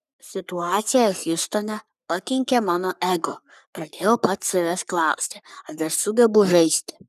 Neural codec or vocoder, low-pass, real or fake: codec, 44.1 kHz, 3.4 kbps, Pupu-Codec; 14.4 kHz; fake